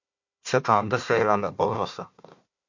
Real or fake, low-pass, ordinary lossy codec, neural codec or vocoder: fake; 7.2 kHz; MP3, 48 kbps; codec, 16 kHz, 1 kbps, FunCodec, trained on Chinese and English, 50 frames a second